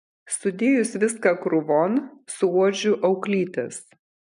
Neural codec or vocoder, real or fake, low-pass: none; real; 10.8 kHz